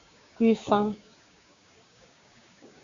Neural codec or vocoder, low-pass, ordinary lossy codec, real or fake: codec, 16 kHz, 6 kbps, DAC; 7.2 kHz; Opus, 64 kbps; fake